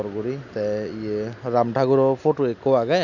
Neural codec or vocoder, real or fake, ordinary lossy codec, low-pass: none; real; none; 7.2 kHz